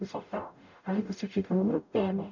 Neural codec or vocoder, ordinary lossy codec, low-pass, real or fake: codec, 44.1 kHz, 0.9 kbps, DAC; none; 7.2 kHz; fake